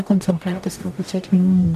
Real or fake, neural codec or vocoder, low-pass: fake; codec, 44.1 kHz, 0.9 kbps, DAC; 14.4 kHz